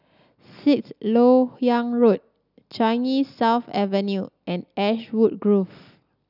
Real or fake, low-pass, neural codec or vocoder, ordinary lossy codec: real; 5.4 kHz; none; none